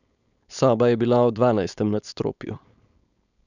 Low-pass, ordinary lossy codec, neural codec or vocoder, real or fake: 7.2 kHz; none; codec, 16 kHz, 4.8 kbps, FACodec; fake